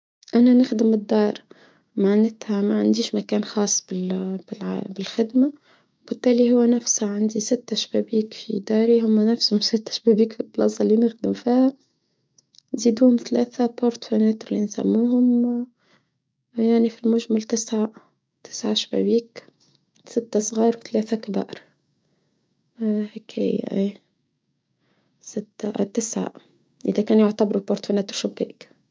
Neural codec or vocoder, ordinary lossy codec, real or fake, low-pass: codec, 16 kHz, 6 kbps, DAC; none; fake; none